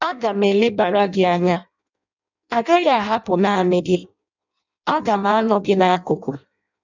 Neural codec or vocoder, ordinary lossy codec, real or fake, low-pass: codec, 16 kHz in and 24 kHz out, 0.6 kbps, FireRedTTS-2 codec; none; fake; 7.2 kHz